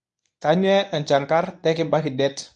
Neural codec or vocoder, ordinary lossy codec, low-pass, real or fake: codec, 24 kHz, 0.9 kbps, WavTokenizer, medium speech release version 1; none; none; fake